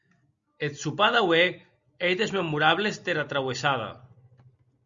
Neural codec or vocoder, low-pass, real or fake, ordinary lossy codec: none; 7.2 kHz; real; Opus, 64 kbps